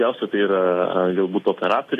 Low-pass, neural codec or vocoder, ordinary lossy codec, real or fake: 14.4 kHz; none; AAC, 48 kbps; real